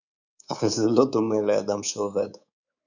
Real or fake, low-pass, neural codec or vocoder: fake; 7.2 kHz; codec, 24 kHz, 3.1 kbps, DualCodec